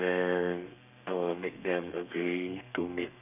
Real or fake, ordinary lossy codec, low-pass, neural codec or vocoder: fake; none; 3.6 kHz; codec, 32 kHz, 1.9 kbps, SNAC